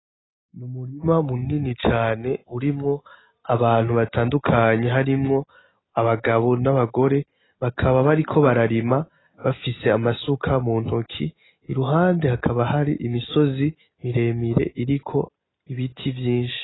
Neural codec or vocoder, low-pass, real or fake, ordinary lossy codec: none; 7.2 kHz; real; AAC, 16 kbps